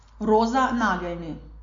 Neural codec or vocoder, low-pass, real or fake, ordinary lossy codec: none; 7.2 kHz; real; AAC, 48 kbps